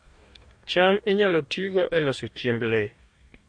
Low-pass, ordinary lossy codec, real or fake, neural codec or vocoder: 9.9 kHz; MP3, 48 kbps; fake; codec, 44.1 kHz, 2.6 kbps, DAC